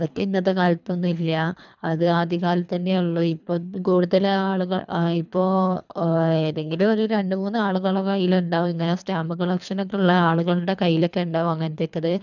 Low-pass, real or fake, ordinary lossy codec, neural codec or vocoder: 7.2 kHz; fake; none; codec, 24 kHz, 3 kbps, HILCodec